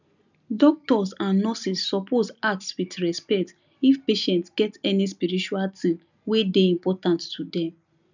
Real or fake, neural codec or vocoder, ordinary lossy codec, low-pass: real; none; none; 7.2 kHz